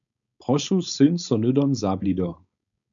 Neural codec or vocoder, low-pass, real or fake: codec, 16 kHz, 4.8 kbps, FACodec; 7.2 kHz; fake